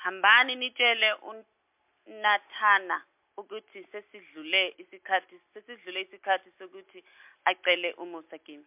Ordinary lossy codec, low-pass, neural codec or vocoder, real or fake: MP3, 32 kbps; 3.6 kHz; none; real